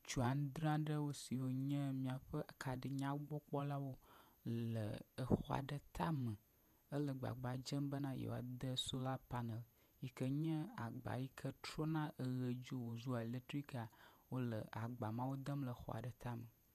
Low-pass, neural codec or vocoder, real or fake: 14.4 kHz; vocoder, 48 kHz, 128 mel bands, Vocos; fake